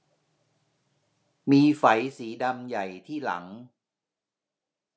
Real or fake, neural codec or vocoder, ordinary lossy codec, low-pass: real; none; none; none